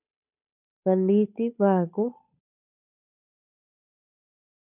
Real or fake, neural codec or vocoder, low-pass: fake; codec, 16 kHz, 8 kbps, FunCodec, trained on Chinese and English, 25 frames a second; 3.6 kHz